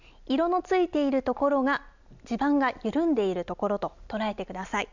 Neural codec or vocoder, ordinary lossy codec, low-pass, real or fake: none; none; 7.2 kHz; real